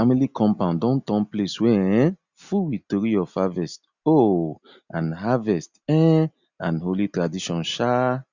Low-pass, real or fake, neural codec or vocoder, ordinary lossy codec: 7.2 kHz; real; none; Opus, 64 kbps